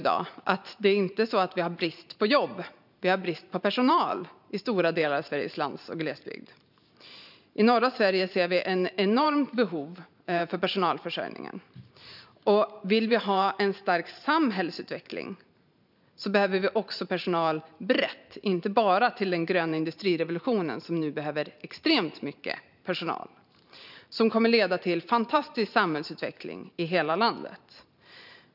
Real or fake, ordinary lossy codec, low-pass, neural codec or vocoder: fake; none; 5.4 kHz; vocoder, 44.1 kHz, 128 mel bands every 512 samples, BigVGAN v2